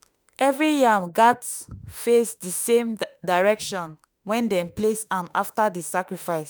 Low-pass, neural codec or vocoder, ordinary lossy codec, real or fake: none; autoencoder, 48 kHz, 32 numbers a frame, DAC-VAE, trained on Japanese speech; none; fake